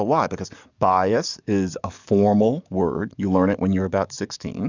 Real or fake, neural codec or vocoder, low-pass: fake; codec, 44.1 kHz, 7.8 kbps, DAC; 7.2 kHz